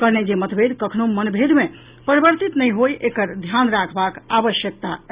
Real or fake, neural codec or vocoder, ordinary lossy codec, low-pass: real; none; Opus, 64 kbps; 3.6 kHz